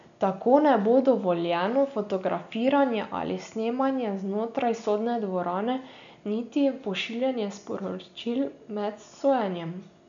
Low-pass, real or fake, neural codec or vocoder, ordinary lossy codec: 7.2 kHz; real; none; none